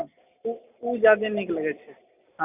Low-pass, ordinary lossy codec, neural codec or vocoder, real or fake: 3.6 kHz; none; none; real